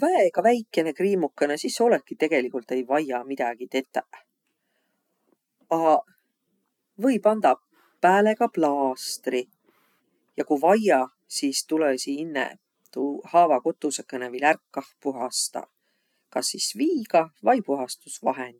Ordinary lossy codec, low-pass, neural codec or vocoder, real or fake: none; 19.8 kHz; none; real